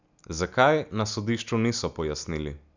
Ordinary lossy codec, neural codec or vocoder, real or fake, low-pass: none; none; real; 7.2 kHz